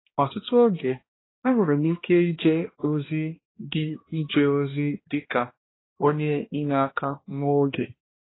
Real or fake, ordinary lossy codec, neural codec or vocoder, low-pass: fake; AAC, 16 kbps; codec, 16 kHz, 1 kbps, X-Codec, HuBERT features, trained on balanced general audio; 7.2 kHz